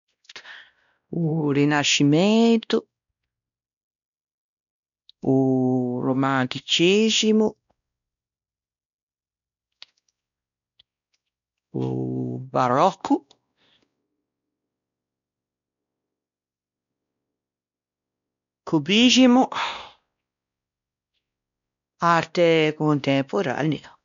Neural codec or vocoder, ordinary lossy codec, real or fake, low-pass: codec, 16 kHz, 1 kbps, X-Codec, WavLM features, trained on Multilingual LibriSpeech; none; fake; 7.2 kHz